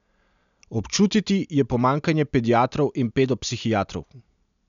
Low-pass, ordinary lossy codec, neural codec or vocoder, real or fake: 7.2 kHz; MP3, 96 kbps; none; real